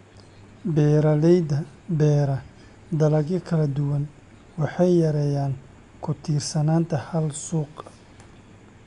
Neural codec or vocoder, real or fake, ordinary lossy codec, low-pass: none; real; none; 10.8 kHz